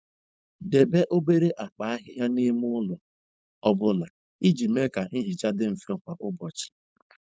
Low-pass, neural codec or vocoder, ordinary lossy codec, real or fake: none; codec, 16 kHz, 4.8 kbps, FACodec; none; fake